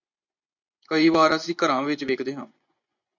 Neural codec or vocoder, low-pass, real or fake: vocoder, 24 kHz, 100 mel bands, Vocos; 7.2 kHz; fake